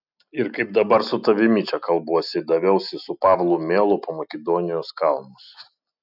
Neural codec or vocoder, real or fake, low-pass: none; real; 5.4 kHz